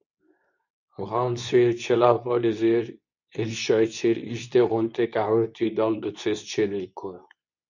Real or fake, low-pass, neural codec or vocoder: fake; 7.2 kHz; codec, 24 kHz, 0.9 kbps, WavTokenizer, medium speech release version 2